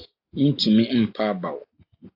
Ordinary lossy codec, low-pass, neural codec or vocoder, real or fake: AAC, 48 kbps; 5.4 kHz; none; real